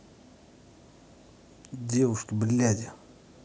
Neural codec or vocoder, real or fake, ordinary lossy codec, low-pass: none; real; none; none